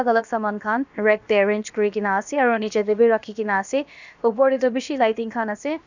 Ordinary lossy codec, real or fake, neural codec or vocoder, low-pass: none; fake; codec, 16 kHz, about 1 kbps, DyCAST, with the encoder's durations; 7.2 kHz